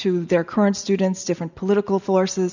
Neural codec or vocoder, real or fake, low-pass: none; real; 7.2 kHz